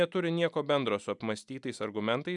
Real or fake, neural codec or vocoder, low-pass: real; none; 10.8 kHz